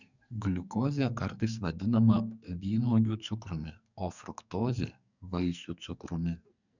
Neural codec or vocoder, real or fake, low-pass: codec, 44.1 kHz, 2.6 kbps, SNAC; fake; 7.2 kHz